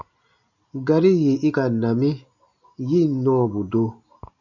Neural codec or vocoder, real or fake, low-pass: none; real; 7.2 kHz